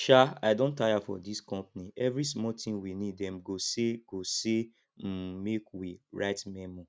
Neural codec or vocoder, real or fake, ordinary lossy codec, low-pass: none; real; none; none